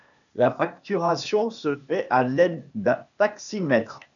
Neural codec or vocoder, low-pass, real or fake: codec, 16 kHz, 0.8 kbps, ZipCodec; 7.2 kHz; fake